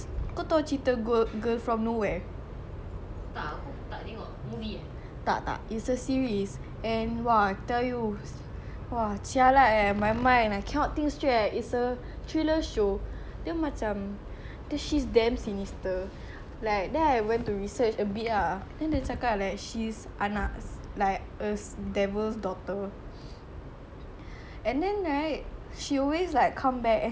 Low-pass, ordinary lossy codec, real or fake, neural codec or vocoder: none; none; real; none